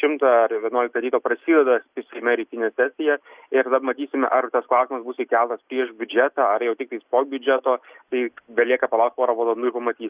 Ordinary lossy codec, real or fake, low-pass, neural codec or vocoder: Opus, 64 kbps; real; 3.6 kHz; none